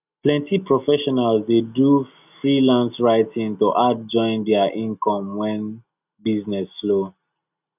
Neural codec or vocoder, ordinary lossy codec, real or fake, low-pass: none; none; real; 3.6 kHz